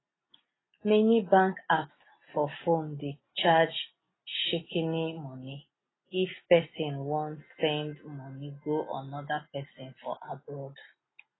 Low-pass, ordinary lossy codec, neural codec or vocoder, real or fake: 7.2 kHz; AAC, 16 kbps; none; real